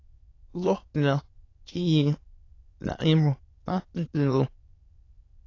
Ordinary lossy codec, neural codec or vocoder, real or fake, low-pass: AAC, 32 kbps; autoencoder, 22.05 kHz, a latent of 192 numbers a frame, VITS, trained on many speakers; fake; 7.2 kHz